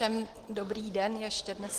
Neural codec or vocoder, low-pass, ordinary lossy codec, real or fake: none; 14.4 kHz; Opus, 16 kbps; real